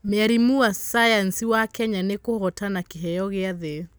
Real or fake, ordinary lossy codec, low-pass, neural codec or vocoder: real; none; none; none